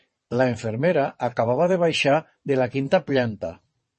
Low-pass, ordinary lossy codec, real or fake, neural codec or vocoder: 9.9 kHz; MP3, 32 kbps; fake; vocoder, 22.05 kHz, 80 mel bands, WaveNeXt